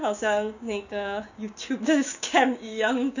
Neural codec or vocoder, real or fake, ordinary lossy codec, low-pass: codec, 16 kHz, 6 kbps, DAC; fake; none; 7.2 kHz